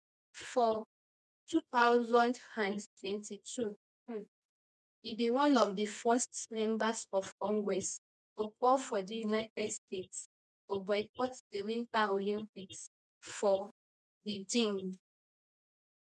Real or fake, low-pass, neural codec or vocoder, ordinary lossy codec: fake; none; codec, 24 kHz, 0.9 kbps, WavTokenizer, medium music audio release; none